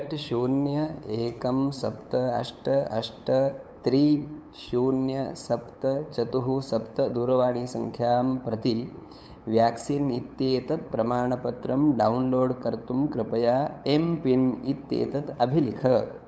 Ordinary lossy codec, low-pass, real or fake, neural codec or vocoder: none; none; fake; codec, 16 kHz, 8 kbps, FunCodec, trained on LibriTTS, 25 frames a second